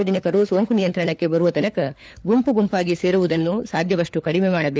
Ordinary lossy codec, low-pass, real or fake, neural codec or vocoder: none; none; fake; codec, 16 kHz, 4 kbps, FunCodec, trained on LibriTTS, 50 frames a second